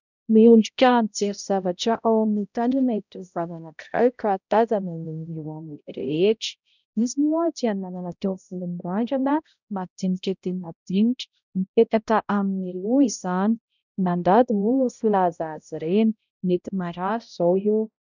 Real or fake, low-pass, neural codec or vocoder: fake; 7.2 kHz; codec, 16 kHz, 0.5 kbps, X-Codec, HuBERT features, trained on balanced general audio